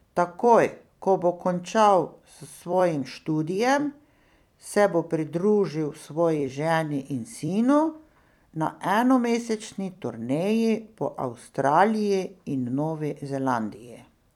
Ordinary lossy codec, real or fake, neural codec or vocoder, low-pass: none; fake; vocoder, 44.1 kHz, 128 mel bands every 256 samples, BigVGAN v2; 19.8 kHz